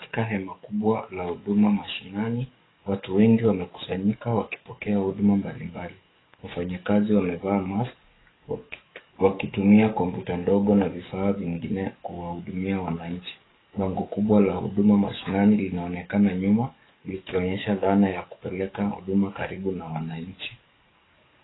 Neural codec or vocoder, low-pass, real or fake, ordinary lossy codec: codec, 44.1 kHz, 7.8 kbps, DAC; 7.2 kHz; fake; AAC, 16 kbps